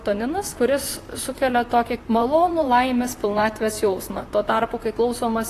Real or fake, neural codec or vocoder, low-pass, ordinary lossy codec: fake; vocoder, 44.1 kHz, 128 mel bands, Pupu-Vocoder; 14.4 kHz; AAC, 48 kbps